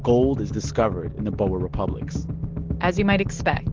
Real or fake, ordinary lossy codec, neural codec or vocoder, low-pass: real; Opus, 16 kbps; none; 7.2 kHz